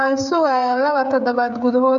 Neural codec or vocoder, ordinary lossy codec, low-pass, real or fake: codec, 16 kHz, 16 kbps, FreqCodec, smaller model; none; 7.2 kHz; fake